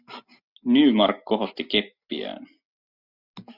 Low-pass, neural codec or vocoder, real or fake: 5.4 kHz; none; real